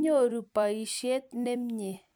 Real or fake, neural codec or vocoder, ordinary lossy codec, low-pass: fake; vocoder, 44.1 kHz, 128 mel bands every 256 samples, BigVGAN v2; none; none